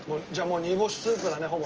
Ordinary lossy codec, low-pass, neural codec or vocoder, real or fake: Opus, 24 kbps; 7.2 kHz; none; real